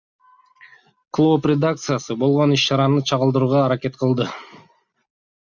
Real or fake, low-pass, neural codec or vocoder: real; 7.2 kHz; none